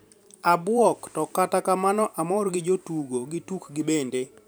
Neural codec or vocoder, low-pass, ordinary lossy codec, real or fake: vocoder, 44.1 kHz, 128 mel bands every 512 samples, BigVGAN v2; none; none; fake